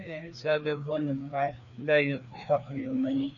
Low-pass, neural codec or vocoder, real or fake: 7.2 kHz; codec, 16 kHz, 2 kbps, FreqCodec, larger model; fake